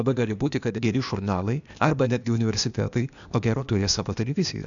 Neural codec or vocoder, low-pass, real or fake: codec, 16 kHz, 0.8 kbps, ZipCodec; 7.2 kHz; fake